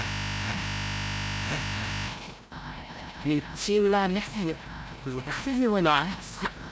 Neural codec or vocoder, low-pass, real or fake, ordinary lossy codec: codec, 16 kHz, 0.5 kbps, FreqCodec, larger model; none; fake; none